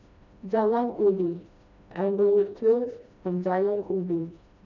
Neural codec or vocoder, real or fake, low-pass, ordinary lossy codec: codec, 16 kHz, 1 kbps, FreqCodec, smaller model; fake; 7.2 kHz; none